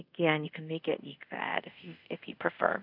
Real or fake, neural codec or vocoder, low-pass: fake; codec, 24 kHz, 0.5 kbps, DualCodec; 5.4 kHz